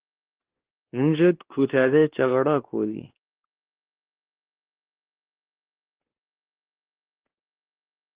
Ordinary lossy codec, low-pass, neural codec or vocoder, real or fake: Opus, 16 kbps; 3.6 kHz; codec, 16 kHz, 2 kbps, X-Codec, WavLM features, trained on Multilingual LibriSpeech; fake